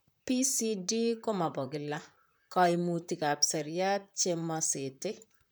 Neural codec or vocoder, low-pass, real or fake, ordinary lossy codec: vocoder, 44.1 kHz, 128 mel bands, Pupu-Vocoder; none; fake; none